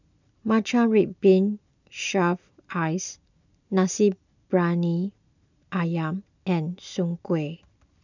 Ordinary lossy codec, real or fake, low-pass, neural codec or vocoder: none; real; 7.2 kHz; none